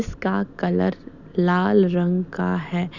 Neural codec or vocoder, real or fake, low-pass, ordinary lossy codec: none; real; 7.2 kHz; none